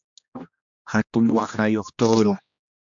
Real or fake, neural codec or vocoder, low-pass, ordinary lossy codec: fake; codec, 16 kHz, 1 kbps, X-Codec, HuBERT features, trained on general audio; 7.2 kHz; MP3, 64 kbps